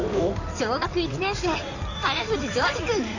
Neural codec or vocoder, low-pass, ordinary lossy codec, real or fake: codec, 16 kHz in and 24 kHz out, 2.2 kbps, FireRedTTS-2 codec; 7.2 kHz; none; fake